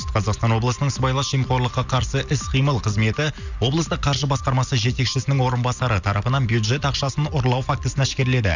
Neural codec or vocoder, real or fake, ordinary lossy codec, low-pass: none; real; none; 7.2 kHz